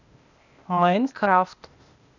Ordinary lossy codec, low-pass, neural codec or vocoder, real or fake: none; 7.2 kHz; codec, 16 kHz, 0.8 kbps, ZipCodec; fake